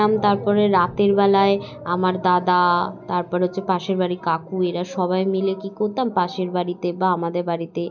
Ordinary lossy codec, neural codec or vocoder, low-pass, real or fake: none; none; 7.2 kHz; real